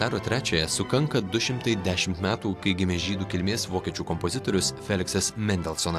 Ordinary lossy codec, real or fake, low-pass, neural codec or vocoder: AAC, 96 kbps; real; 14.4 kHz; none